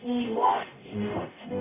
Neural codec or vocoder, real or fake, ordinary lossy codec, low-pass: codec, 44.1 kHz, 0.9 kbps, DAC; fake; none; 3.6 kHz